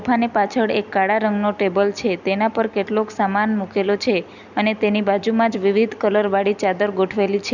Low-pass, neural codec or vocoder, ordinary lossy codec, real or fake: 7.2 kHz; none; none; real